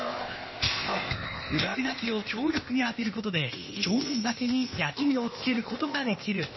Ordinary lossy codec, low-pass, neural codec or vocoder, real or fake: MP3, 24 kbps; 7.2 kHz; codec, 16 kHz, 0.8 kbps, ZipCodec; fake